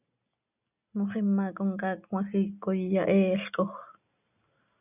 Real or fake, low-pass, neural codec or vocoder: real; 3.6 kHz; none